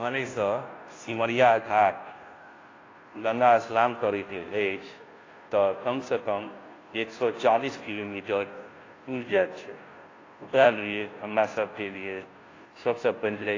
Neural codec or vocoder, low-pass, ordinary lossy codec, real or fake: codec, 16 kHz, 0.5 kbps, FunCodec, trained on Chinese and English, 25 frames a second; 7.2 kHz; AAC, 32 kbps; fake